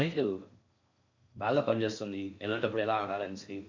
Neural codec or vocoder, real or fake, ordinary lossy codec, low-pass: codec, 16 kHz in and 24 kHz out, 0.6 kbps, FocalCodec, streaming, 4096 codes; fake; MP3, 48 kbps; 7.2 kHz